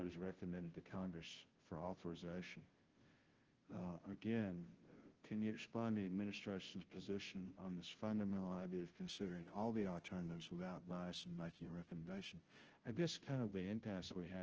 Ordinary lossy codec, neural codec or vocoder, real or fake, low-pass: Opus, 16 kbps; codec, 16 kHz, 0.5 kbps, FunCodec, trained on Chinese and English, 25 frames a second; fake; 7.2 kHz